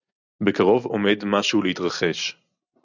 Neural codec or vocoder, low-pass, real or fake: none; 7.2 kHz; real